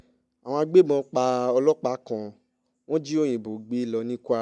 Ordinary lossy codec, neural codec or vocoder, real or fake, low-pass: none; none; real; 10.8 kHz